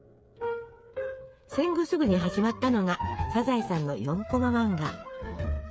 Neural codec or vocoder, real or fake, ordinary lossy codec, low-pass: codec, 16 kHz, 8 kbps, FreqCodec, smaller model; fake; none; none